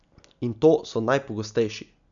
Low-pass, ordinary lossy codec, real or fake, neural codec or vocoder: 7.2 kHz; none; real; none